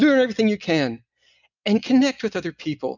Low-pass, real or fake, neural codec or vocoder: 7.2 kHz; real; none